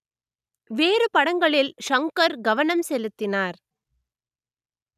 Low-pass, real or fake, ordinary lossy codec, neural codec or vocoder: 14.4 kHz; real; none; none